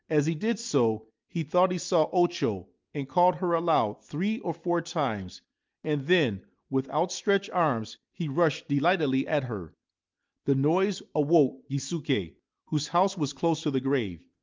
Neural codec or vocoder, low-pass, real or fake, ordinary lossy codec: none; 7.2 kHz; real; Opus, 24 kbps